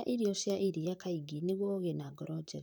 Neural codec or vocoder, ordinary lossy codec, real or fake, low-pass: vocoder, 44.1 kHz, 128 mel bands, Pupu-Vocoder; none; fake; none